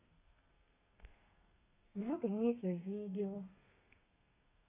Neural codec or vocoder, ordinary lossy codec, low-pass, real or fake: codec, 32 kHz, 1.9 kbps, SNAC; none; 3.6 kHz; fake